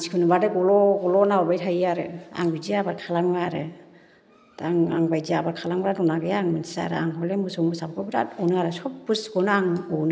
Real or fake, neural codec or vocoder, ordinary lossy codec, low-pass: real; none; none; none